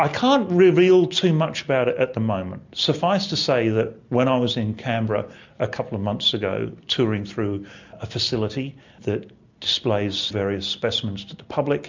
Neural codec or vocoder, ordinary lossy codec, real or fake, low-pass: none; MP3, 64 kbps; real; 7.2 kHz